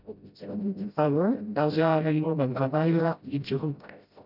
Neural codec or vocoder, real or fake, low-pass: codec, 16 kHz, 0.5 kbps, FreqCodec, smaller model; fake; 5.4 kHz